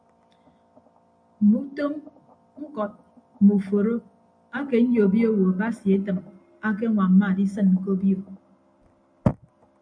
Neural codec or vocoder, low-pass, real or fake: none; 9.9 kHz; real